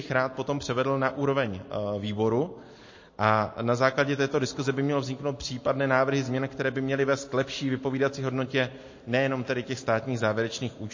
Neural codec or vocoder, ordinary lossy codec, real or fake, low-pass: none; MP3, 32 kbps; real; 7.2 kHz